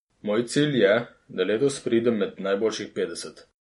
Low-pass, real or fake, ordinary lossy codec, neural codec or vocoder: 19.8 kHz; real; MP3, 48 kbps; none